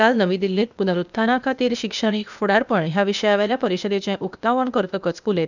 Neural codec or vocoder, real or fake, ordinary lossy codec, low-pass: codec, 16 kHz, 0.8 kbps, ZipCodec; fake; none; 7.2 kHz